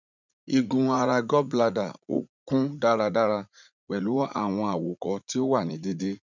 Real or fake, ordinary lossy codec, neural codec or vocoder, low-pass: fake; none; vocoder, 44.1 kHz, 80 mel bands, Vocos; 7.2 kHz